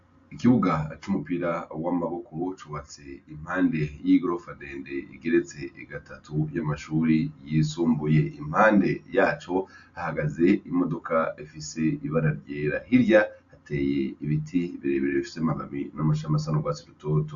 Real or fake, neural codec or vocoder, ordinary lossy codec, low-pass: real; none; AAC, 64 kbps; 7.2 kHz